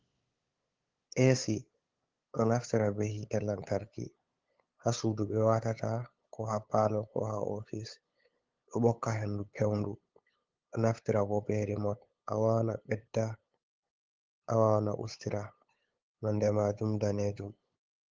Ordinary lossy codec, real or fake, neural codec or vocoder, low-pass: Opus, 16 kbps; fake; codec, 16 kHz, 8 kbps, FunCodec, trained on LibriTTS, 25 frames a second; 7.2 kHz